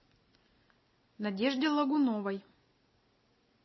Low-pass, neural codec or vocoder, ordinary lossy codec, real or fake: 7.2 kHz; none; MP3, 24 kbps; real